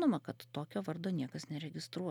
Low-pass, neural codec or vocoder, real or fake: 19.8 kHz; none; real